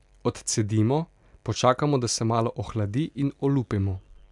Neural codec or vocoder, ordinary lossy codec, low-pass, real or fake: none; MP3, 96 kbps; 10.8 kHz; real